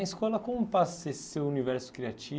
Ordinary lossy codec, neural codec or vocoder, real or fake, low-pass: none; none; real; none